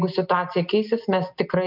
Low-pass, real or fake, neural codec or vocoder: 5.4 kHz; real; none